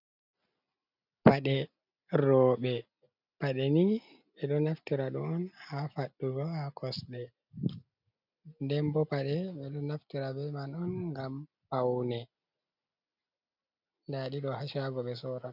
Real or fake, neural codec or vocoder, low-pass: real; none; 5.4 kHz